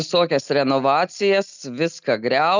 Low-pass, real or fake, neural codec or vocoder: 7.2 kHz; real; none